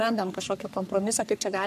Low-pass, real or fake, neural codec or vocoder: 14.4 kHz; fake; codec, 44.1 kHz, 3.4 kbps, Pupu-Codec